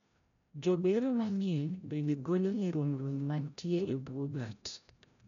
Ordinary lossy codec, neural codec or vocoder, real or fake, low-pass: none; codec, 16 kHz, 0.5 kbps, FreqCodec, larger model; fake; 7.2 kHz